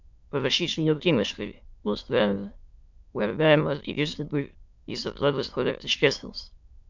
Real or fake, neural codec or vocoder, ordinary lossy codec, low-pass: fake; autoencoder, 22.05 kHz, a latent of 192 numbers a frame, VITS, trained on many speakers; MP3, 64 kbps; 7.2 kHz